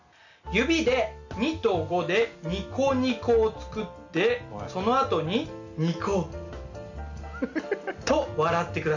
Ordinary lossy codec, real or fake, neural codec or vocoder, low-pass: none; real; none; 7.2 kHz